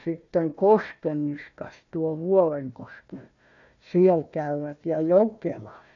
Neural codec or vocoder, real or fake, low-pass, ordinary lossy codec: codec, 16 kHz, 1 kbps, FunCodec, trained on Chinese and English, 50 frames a second; fake; 7.2 kHz; none